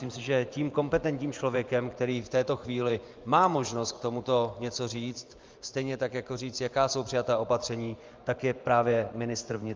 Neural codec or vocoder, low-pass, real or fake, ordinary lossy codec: vocoder, 24 kHz, 100 mel bands, Vocos; 7.2 kHz; fake; Opus, 24 kbps